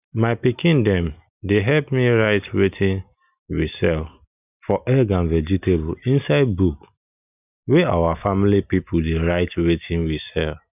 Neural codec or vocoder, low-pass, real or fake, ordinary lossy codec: none; 3.6 kHz; real; none